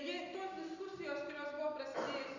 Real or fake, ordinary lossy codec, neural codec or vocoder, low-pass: real; Opus, 64 kbps; none; 7.2 kHz